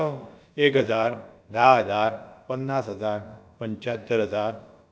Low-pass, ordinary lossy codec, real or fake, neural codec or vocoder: none; none; fake; codec, 16 kHz, about 1 kbps, DyCAST, with the encoder's durations